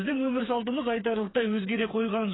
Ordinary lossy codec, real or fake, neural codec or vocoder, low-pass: AAC, 16 kbps; fake; codec, 16 kHz, 4 kbps, FreqCodec, smaller model; 7.2 kHz